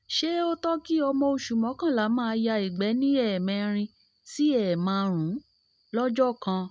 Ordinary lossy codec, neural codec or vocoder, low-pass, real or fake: none; none; none; real